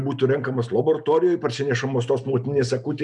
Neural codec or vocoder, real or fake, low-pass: none; real; 10.8 kHz